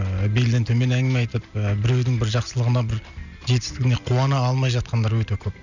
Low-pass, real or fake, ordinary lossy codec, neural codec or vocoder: 7.2 kHz; real; none; none